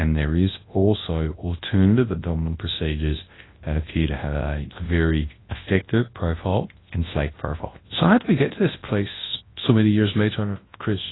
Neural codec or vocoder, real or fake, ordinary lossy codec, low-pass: codec, 24 kHz, 0.9 kbps, WavTokenizer, large speech release; fake; AAC, 16 kbps; 7.2 kHz